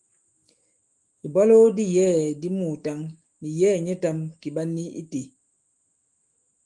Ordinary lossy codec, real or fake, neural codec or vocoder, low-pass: Opus, 24 kbps; fake; autoencoder, 48 kHz, 128 numbers a frame, DAC-VAE, trained on Japanese speech; 10.8 kHz